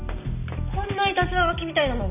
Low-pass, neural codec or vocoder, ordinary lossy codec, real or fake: 3.6 kHz; none; none; real